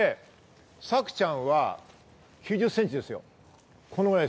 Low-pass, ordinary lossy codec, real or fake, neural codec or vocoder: none; none; real; none